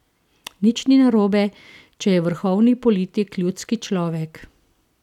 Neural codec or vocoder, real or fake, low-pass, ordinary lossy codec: none; real; 19.8 kHz; none